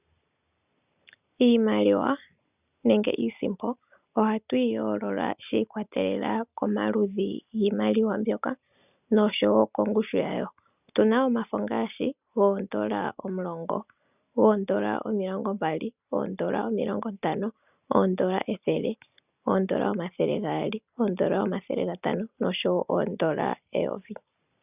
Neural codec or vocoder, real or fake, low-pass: none; real; 3.6 kHz